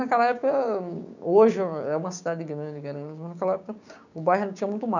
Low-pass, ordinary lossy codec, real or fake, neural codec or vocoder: 7.2 kHz; none; fake; codec, 16 kHz, 6 kbps, DAC